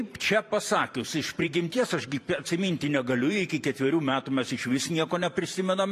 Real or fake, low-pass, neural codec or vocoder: fake; 14.4 kHz; vocoder, 44.1 kHz, 128 mel bands every 512 samples, BigVGAN v2